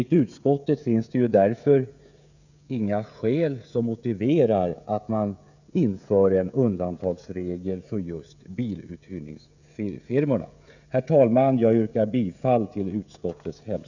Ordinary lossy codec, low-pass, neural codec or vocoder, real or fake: none; 7.2 kHz; codec, 16 kHz, 16 kbps, FreqCodec, smaller model; fake